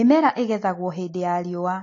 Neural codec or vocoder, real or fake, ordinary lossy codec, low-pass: none; real; AAC, 32 kbps; 7.2 kHz